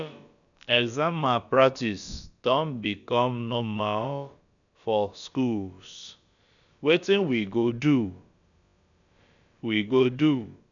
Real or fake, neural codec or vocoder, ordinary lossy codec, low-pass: fake; codec, 16 kHz, about 1 kbps, DyCAST, with the encoder's durations; none; 7.2 kHz